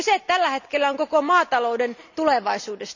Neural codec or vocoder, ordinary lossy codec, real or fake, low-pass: none; none; real; 7.2 kHz